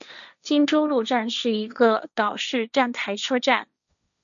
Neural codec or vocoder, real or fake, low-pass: codec, 16 kHz, 1.1 kbps, Voila-Tokenizer; fake; 7.2 kHz